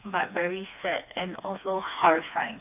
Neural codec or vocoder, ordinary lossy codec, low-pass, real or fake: codec, 16 kHz, 2 kbps, FreqCodec, smaller model; AAC, 32 kbps; 3.6 kHz; fake